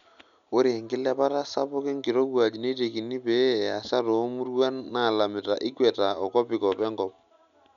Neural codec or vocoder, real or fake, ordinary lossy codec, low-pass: none; real; none; 7.2 kHz